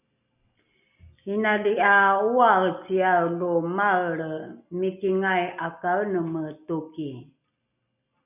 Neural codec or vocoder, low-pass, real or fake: none; 3.6 kHz; real